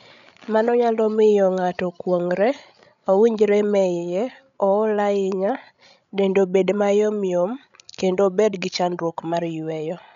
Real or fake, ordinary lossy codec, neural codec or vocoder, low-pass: fake; none; codec, 16 kHz, 16 kbps, FreqCodec, larger model; 7.2 kHz